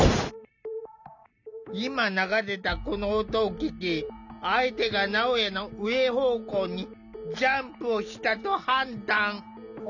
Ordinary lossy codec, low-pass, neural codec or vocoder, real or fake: none; 7.2 kHz; none; real